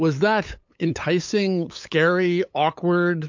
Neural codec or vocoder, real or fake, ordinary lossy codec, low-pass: codec, 16 kHz, 8 kbps, FunCodec, trained on LibriTTS, 25 frames a second; fake; MP3, 48 kbps; 7.2 kHz